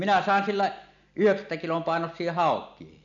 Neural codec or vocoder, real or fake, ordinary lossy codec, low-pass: none; real; none; 7.2 kHz